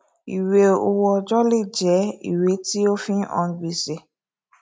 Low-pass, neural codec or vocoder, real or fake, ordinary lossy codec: none; none; real; none